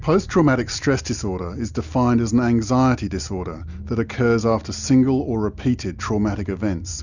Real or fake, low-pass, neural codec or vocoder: real; 7.2 kHz; none